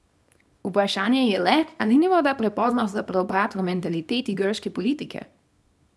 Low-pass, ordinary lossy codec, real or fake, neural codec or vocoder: none; none; fake; codec, 24 kHz, 0.9 kbps, WavTokenizer, small release